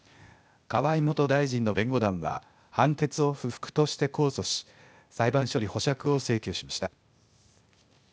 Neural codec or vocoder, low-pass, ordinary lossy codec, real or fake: codec, 16 kHz, 0.8 kbps, ZipCodec; none; none; fake